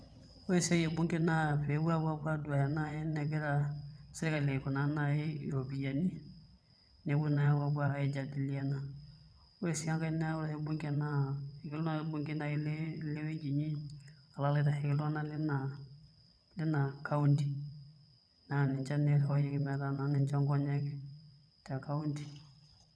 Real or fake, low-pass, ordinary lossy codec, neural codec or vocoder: fake; none; none; vocoder, 22.05 kHz, 80 mel bands, WaveNeXt